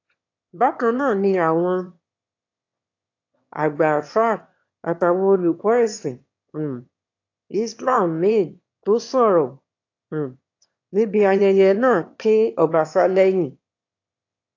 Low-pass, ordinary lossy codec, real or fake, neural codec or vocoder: 7.2 kHz; AAC, 48 kbps; fake; autoencoder, 22.05 kHz, a latent of 192 numbers a frame, VITS, trained on one speaker